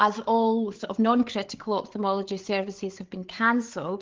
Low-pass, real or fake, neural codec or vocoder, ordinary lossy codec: 7.2 kHz; fake; codec, 16 kHz, 8 kbps, FreqCodec, larger model; Opus, 16 kbps